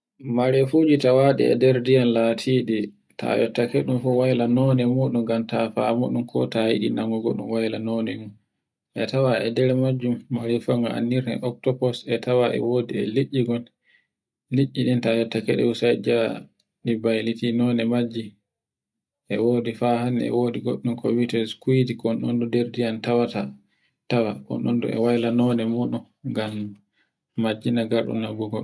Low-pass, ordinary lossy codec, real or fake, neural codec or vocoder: none; none; real; none